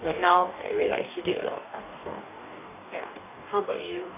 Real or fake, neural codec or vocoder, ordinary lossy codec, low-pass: fake; codec, 44.1 kHz, 2.6 kbps, DAC; none; 3.6 kHz